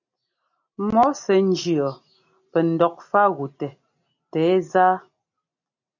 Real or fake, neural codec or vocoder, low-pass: real; none; 7.2 kHz